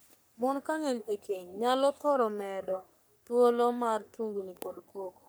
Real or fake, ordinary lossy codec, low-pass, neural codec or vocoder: fake; none; none; codec, 44.1 kHz, 3.4 kbps, Pupu-Codec